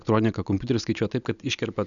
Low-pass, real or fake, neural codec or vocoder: 7.2 kHz; real; none